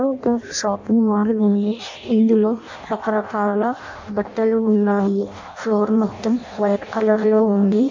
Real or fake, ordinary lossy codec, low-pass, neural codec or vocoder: fake; MP3, 48 kbps; 7.2 kHz; codec, 16 kHz in and 24 kHz out, 0.6 kbps, FireRedTTS-2 codec